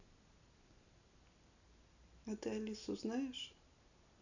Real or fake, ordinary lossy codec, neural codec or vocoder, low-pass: real; none; none; 7.2 kHz